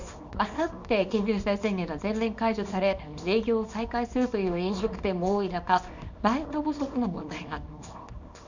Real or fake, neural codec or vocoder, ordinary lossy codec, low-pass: fake; codec, 24 kHz, 0.9 kbps, WavTokenizer, small release; none; 7.2 kHz